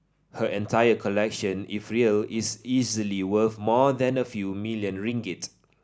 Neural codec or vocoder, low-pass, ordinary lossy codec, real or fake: none; none; none; real